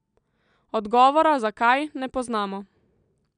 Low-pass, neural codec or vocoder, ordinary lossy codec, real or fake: 9.9 kHz; none; none; real